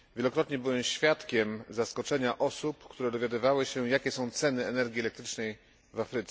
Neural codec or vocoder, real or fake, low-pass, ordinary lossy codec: none; real; none; none